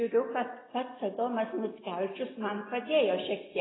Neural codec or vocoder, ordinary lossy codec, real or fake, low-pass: none; AAC, 16 kbps; real; 7.2 kHz